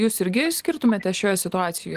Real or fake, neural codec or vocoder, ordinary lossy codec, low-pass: real; none; Opus, 24 kbps; 14.4 kHz